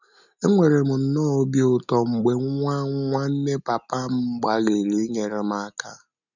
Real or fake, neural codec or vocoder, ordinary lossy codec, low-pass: real; none; none; 7.2 kHz